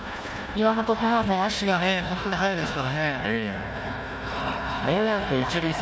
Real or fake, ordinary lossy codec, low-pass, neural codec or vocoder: fake; none; none; codec, 16 kHz, 1 kbps, FunCodec, trained on Chinese and English, 50 frames a second